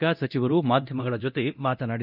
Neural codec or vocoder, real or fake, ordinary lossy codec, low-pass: codec, 24 kHz, 0.9 kbps, DualCodec; fake; none; 5.4 kHz